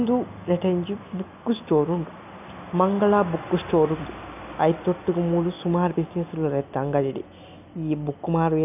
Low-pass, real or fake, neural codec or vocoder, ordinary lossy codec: 3.6 kHz; real; none; none